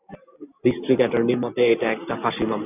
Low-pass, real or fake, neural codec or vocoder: 3.6 kHz; real; none